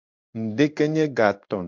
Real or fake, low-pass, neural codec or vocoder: fake; 7.2 kHz; codec, 16 kHz in and 24 kHz out, 1 kbps, XY-Tokenizer